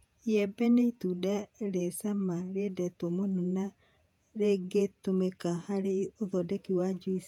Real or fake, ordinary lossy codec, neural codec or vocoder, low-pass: fake; none; vocoder, 48 kHz, 128 mel bands, Vocos; 19.8 kHz